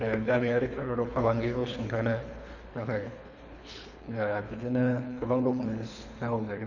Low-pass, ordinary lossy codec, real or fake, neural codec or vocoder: 7.2 kHz; none; fake; codec, 24 kHz, 3 kbps, HILCodec